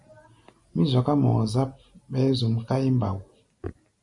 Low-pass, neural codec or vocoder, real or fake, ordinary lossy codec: 10.8 kHz; none; real; MP3, 48 kbps